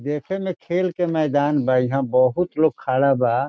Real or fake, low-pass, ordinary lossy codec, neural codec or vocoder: real; none; none; none